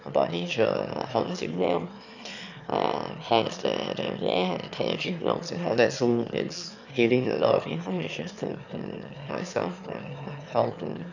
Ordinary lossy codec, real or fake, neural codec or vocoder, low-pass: none; fake; autoencoder, 22.05 kHz, a latent of 192 numbers a frame, VITS, trained on one speaker; 7.2 kHz